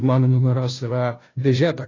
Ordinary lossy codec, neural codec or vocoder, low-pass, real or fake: AAC, 32 kbps; codec, 16 kHz, 0.5 kbps, FunCodec, trained on Chinese and English, 25 frames a second; 7.2 kHz; fake